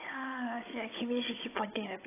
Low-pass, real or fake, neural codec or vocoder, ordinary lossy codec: 3.6 kHz; fake; codec, 16 kHz, 16 kbps, FunCodec, trained on Chinese and English, 50 frames a second; AAC, 16 kbps